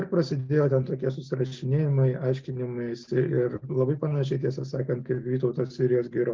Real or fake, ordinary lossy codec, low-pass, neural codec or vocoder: real; Opus, 32 kbps; 7.2 kHz; none